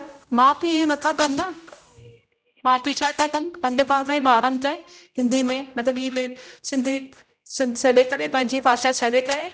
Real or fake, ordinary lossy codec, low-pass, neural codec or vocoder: fake; none; none; codec, 16 kHz, 0.5 kbps, X-Codec, HuBERT features, trained on general audio